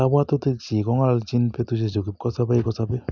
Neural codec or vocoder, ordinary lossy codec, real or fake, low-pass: none; none; real; 7.2 kHz